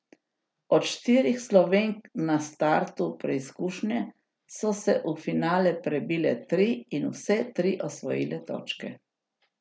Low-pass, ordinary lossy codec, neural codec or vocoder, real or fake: none; none; none; real